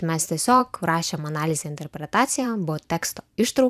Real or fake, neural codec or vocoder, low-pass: fake; vocoder, 44.1 kHz, 128 mel bands every 512 samples, BigVGAN v2; 14.4 kHz